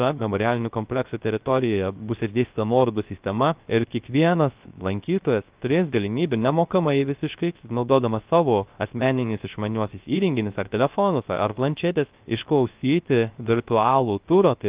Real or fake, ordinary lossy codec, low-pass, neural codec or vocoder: fake; Opus, 32 kbps; 3.6 kHz; codec, 16 kHz, 0.3 kbps, FocalCodec